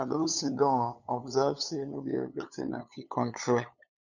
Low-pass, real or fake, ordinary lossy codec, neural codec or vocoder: 7.2 kHz; fake; none; codec, 16 kHz, 8 kbps, FunCodec, trained on LibriTTS, 25 frames a second